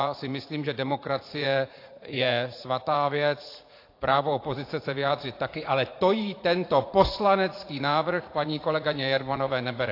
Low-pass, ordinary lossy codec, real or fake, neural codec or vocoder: 5.4 kHz; AAC, 32 kbps; fake; vocoder, 44.1 kHz, 80 mel bands, Vocos